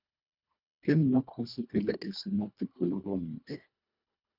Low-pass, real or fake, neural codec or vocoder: 5.4 kHz; fake; codec, 24 kHz, 1.5 kbps, HILCodec